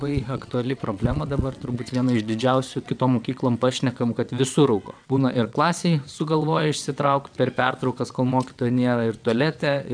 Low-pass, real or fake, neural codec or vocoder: 9.9 kHz; fake; vocoder, 22.05 kHz, 80 mel bands, WaveNeXt